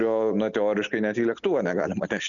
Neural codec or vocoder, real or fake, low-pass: none; real; 7.2 kHz